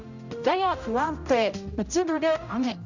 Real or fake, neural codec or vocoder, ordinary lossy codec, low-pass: fake; codec, 16 kHz, 0.5 kbps, X-Codec, HuBERT features, trained on general audio; none; 7.2 kHz